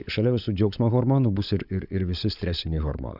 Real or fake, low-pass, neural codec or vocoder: fake; 5.4 kHz; codec, 16 kHz, 16 kbps, FunCodec, trained on LibriTTS, 50 frames a second